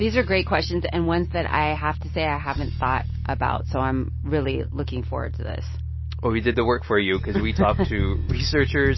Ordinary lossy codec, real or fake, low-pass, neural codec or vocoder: MP3, 24 kbps; real; 7.2 kHz; none